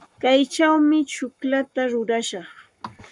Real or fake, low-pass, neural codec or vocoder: fake; 10.8 kHz; codec, 44.1 kHz, 7.8 kbps, Pupu-Codec